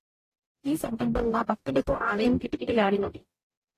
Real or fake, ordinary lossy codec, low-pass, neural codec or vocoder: fake; AAC, 48 kbps; 14.4 kHz; codec, 44.1 kHz, 0.9 kbps, DAC